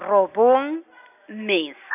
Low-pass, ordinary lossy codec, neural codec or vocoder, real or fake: 3.6 kHz; none; none; real